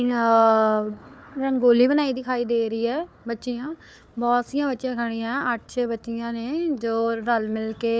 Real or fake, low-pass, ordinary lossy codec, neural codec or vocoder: fake; none; none; codec, 16 kHz, 4 kbps, FunCodec, trained on Chinese and English, 50 frames a second